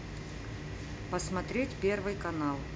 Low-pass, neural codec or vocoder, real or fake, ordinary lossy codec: none; none; real; none